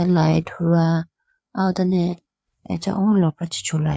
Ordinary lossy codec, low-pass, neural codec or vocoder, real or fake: none; none; codec, 16 kHz, 4 kbps, FreqCodec, larger model; fake